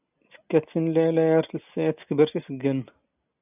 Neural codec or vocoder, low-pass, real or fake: none; 3.6 kHz; real